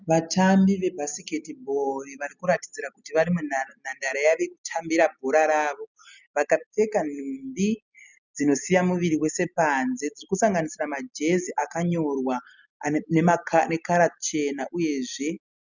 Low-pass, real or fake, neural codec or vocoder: 7.2 kHz; real; none